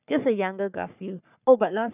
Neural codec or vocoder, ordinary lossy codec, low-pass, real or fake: codec, 44.1 kHz, 3.4 kbps, Pupu-Codec; none; 3.6 kHz; fake